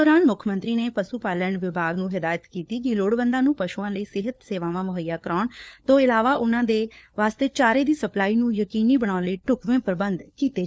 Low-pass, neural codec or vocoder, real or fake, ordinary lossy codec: none; codec, 16 kHz, 4 kbps, FunCodec, trained on LibriTTS, 50 frames a second; fake; none